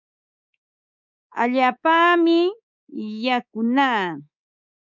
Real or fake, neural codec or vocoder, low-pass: fake; codec, 24 kHz, 3.1 kbps, DualCodec; 7.2 kHz